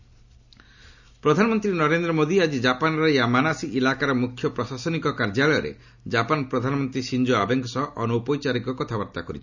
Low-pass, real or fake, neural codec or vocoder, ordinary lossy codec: 7.2 kHz; real; none; none